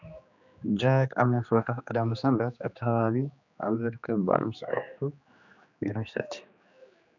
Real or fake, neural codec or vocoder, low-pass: fake; codec, 16 kHz, 2 kbps, X-Codec, HuBERT features, trained on general audio; 7.2 kHz